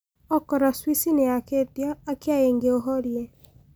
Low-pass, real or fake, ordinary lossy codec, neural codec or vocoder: none; real; none; none